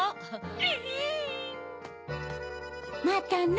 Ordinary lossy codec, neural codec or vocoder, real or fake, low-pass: none; none; real; none